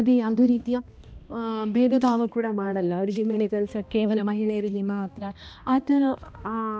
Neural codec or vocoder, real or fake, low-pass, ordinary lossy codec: codec, 16 kHz, 1 kbps, X-Codec, HuBERT features, trained on balanced general audio; fake; none; none